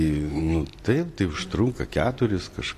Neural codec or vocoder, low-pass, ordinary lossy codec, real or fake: none; 14.4 kHz; AAC, 48 kbps; real